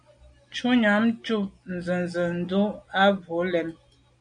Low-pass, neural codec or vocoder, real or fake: 9.9 kHz; none; real